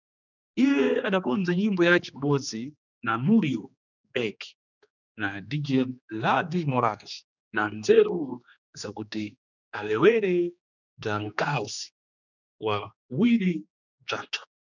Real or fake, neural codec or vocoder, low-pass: fake; codec, 16 kHz, 2 kbps, X-Codec, HuBERT features, trained on general audio; 7.2 kHz